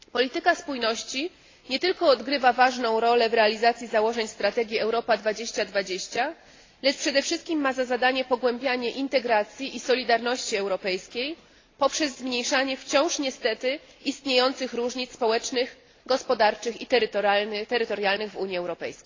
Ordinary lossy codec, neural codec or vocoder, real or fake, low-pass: AAC, 32 kbps; none; real; 7.2 kHz